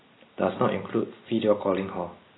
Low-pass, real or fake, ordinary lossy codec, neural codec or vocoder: 7.2 kHz; real; AAC, 16 kbps; none